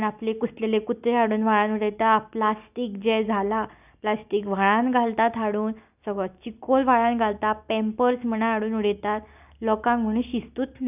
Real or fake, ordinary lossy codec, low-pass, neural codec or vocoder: real; none; 3.6 kHz; none